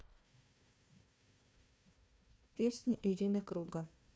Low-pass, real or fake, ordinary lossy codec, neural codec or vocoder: none; fake; none; codec, 16 kHz, 1 kbps, FunCodec, trained on Chinese and English, 50 frames a second